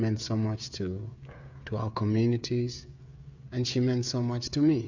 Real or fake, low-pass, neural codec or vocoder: fake; 7.2 kHz; codec, 16 kHz, 16 kbps, FreqCodec, smaller model